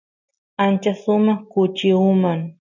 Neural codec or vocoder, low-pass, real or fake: none; 7.2 kHz; real